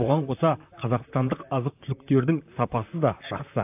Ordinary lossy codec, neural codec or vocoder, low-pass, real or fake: AAC, 32 kbps; vocoder, 44.1 kHz, 128 mel bands, Pupu-Vocoder; 3.6 kHz; fake